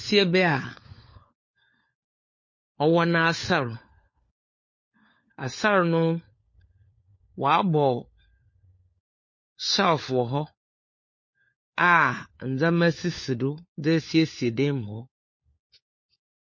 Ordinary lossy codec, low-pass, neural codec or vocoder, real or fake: MP3, 32 kbps; 7.2 kHz; codec, 16 kHz, 4 kbps, FunCodec, trained on LibriTTS, 50 frames a second; fake